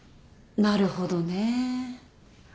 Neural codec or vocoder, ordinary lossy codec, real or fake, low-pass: none; none; real; none